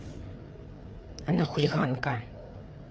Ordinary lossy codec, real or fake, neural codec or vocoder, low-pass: none; fake; codec, 16 kHz, 4 kbps, FreqCodec, larger model; none